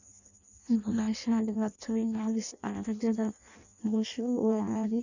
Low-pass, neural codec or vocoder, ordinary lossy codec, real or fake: 7.2 kHz; codec, 16 kHz in and 24 kHz out, 0.6 kbps, FireRedTTS-2 codec; none; fake